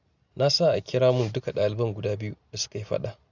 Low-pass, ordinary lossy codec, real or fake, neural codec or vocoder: 7.2 kHz; none; real; none